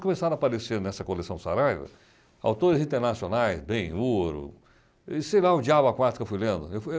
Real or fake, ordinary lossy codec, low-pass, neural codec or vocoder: real; none; none; none